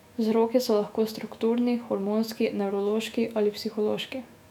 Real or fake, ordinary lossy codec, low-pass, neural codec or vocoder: fake; none; 19.8 kHz; autoencoder, 48 kHz, 128 numbers a frame, DAC-VAE, trained on Japanese speech